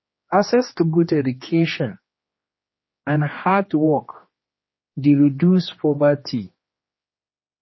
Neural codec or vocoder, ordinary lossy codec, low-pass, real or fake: codec, 16 kHz, 2 kbps, X-Codec, HuBERT features, trained on general audio; MP3, 24 kbps; 7.2 kHz; fake